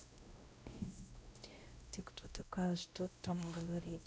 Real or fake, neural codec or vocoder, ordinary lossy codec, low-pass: fake; codec, 16 kHz, 0.5 kbps, X-Codec, WavLM features, trained on Multilingual LibriSpeech; none; none